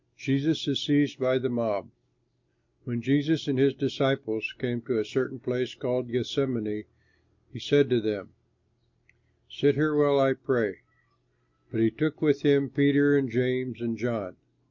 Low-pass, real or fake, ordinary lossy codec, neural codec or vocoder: 7.2 kHz; real; MP3, 48 kbps; none